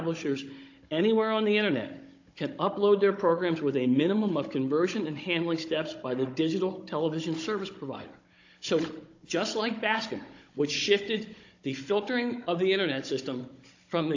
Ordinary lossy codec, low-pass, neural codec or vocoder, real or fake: AAC, 48 kbps; 7.2 kHz; codec, 16 kHz, 16 kbps, FunCodec, trained on Chinese and English, 50 frames a second; fake